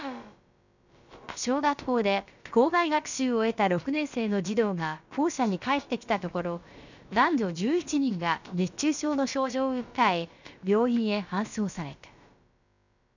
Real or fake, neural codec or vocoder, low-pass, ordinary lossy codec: fake; codec, 16 kHz, about 1 kbps, DyCAST, with the encoder's durations; 7.2 kHz; none